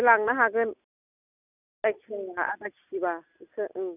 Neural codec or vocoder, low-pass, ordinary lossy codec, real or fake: none; 3.6 kHz; none; real